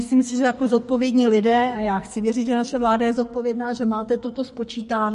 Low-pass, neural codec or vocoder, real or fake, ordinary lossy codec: 14.4 kHz; codec, 44.1 kHz, 2.6 kbps, SNAC; fake; MP3, 48 kbps